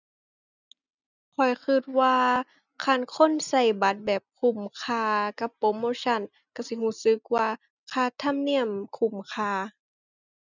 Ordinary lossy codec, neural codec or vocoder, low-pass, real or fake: none; none; 7.2 kHz; real